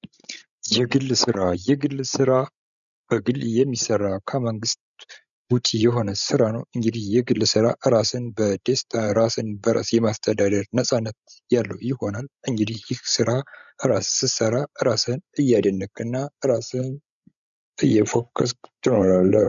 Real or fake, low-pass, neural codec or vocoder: fake; 7.2 kHz; codec, 16 kHz, 16 kbps, FreqCodec, larger model